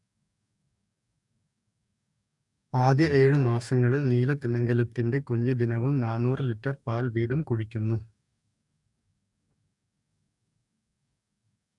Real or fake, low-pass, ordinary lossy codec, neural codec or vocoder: fake; 10.8 kHz; none; codec, 44.1 kHz, 2.6 kbps, DAC